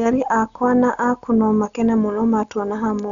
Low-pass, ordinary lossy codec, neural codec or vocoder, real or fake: 7.2 kHz; none; none; real